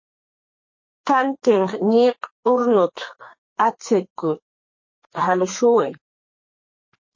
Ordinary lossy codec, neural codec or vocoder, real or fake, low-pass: MP3, 32 kbps; codec, 32 kHz, 1.9 kbps, SNAC; fake; 7.2 kHz